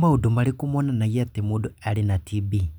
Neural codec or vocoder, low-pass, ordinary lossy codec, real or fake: none; none; none; real